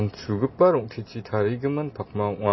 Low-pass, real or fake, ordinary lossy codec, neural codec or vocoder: 7.2 kHz; real; MP3, 24 kbps; none